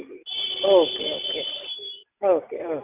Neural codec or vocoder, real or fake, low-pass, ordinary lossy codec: none; real; 3.6 kHz; none